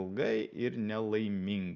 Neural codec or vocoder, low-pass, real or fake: none; 7.2 kHz; real